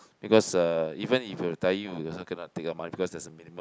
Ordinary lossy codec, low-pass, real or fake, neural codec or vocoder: none; none; real; none